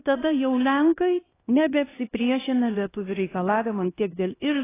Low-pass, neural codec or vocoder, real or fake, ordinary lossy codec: 3.6 kHz; codec, 16 kHz, 1 kbps, X-Codec, HuBERT features, trained on LibriSpeech; fake; AAC, 16 kbps